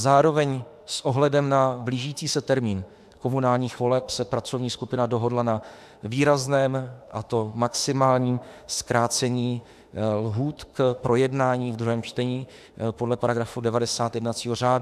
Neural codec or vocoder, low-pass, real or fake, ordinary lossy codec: autoencoder, 48 kHz, 32 numbers a frame, DAC-VAE, trained on Japanese speech; 14.4 kHz; fake; AAC, 96 kbps